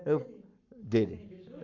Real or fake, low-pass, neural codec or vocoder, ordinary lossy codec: fake; 7.2 kHz; codec, 44.1 kHz, 7.8 kbps, Pupu-Codec; none